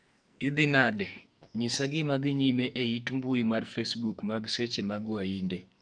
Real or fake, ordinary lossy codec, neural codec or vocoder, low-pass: fake; none; codec, 44.1 kHz, 2.6 kbps, SNAC; 9.9 kHz